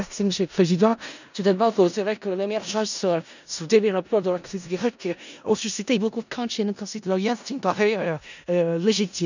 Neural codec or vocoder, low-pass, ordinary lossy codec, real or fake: codec, 16 kHz in and 24 kHz out, 0.4 kbps, LongCat-Audio-Codec, four codebook decoder; 7.2 kHz; none; fake